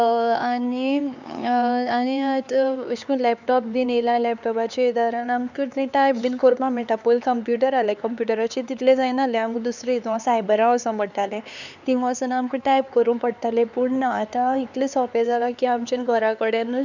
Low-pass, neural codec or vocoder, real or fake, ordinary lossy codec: 7.2 kHz; codec, 16 kHz, 4 kbps, X-Codec, HuBERT features, trained on LibriSpeech; fake; none